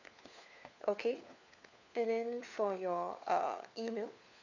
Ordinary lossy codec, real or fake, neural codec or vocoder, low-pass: none; fake; codec, 16 kHz, 4 kbps, FunCodec, trained on LibriTTS, 50 frames a second; 7.2 kHz